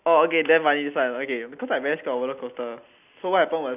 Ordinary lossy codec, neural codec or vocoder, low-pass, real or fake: none; none; 3.6 kHz; real